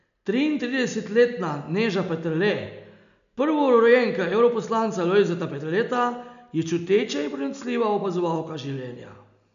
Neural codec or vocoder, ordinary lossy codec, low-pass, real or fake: none; none; 7.2 kHz; real